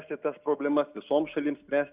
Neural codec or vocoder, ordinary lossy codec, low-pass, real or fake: none; Opus, 64 kbps; 3.6 kHz; real